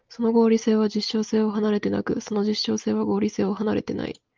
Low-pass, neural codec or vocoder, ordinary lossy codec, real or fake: 7.2 kHz; none; Opus, 24 kbps; real